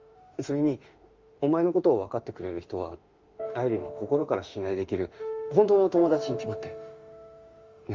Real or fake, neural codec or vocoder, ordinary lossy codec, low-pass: fake; autoencoder, 48 kHz, 32 numbers a frame, DAC-VAE, trained on Japanese speech; Opus, 32 kbps; 7.2 kHz